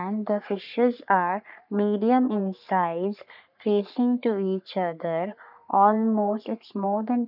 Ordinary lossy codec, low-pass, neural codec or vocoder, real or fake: none; 5.4 kHz; codec, 44.1 kHz, 3.4 kbps, Pupu-Codec; fake